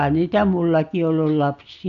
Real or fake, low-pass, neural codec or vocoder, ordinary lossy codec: real; 7.2 kHz; none; Opus, 64 kbps